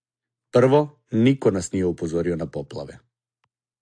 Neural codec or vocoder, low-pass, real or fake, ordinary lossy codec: none; 9.9 kHz; real; AAC, 64 kbps